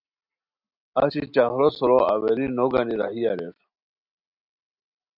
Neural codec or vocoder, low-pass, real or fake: none; 5.4 kHz; real